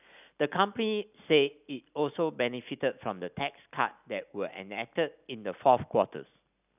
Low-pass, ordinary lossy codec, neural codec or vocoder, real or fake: 3.6 kHz; none; none; real